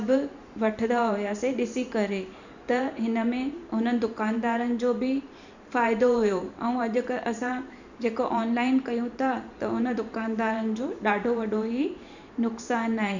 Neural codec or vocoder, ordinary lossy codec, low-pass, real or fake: vocoder, 44.1 kHz, 128 mel bands every 512 samples, BigVGAN v2; none; 7.2 kHz; fake